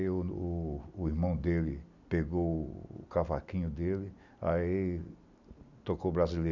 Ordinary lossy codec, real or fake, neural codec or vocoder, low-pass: none; real; none; 7.2 kHz